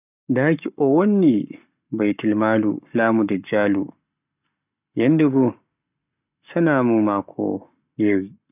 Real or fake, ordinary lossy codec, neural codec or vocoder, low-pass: fake; none; codec, 44.1 kHz, 7.8 kbps, Pupu-Codec; 3.6 kHz